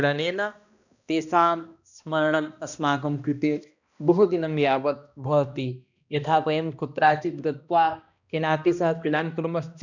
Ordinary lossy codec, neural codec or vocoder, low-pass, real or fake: none; codec, 16 kHz, 1 kbps, X-Codec, HuBERT features, trained on balanced general audio; 7.2 kHz; fake